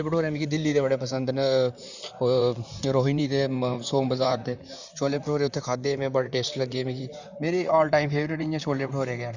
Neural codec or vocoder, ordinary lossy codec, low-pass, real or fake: vocoder, 44.1 kHz, 128 mel bands, Pupu-Vocoder; none; 7.2 kHz; fake